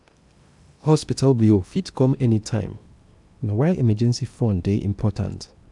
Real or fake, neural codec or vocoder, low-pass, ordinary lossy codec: fake; codec, 16 kHz in and 24 kHz out, 0.8 kbps, FocalCodec, streaming, 65536 codes; 10.8 kHz; none